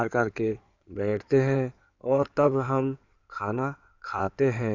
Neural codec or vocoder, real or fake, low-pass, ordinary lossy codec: codec, 16 kHz in and 24 kHz out, 2.2 kbps, FireRedTTS-2 codec; fake; 7.2 kHz; none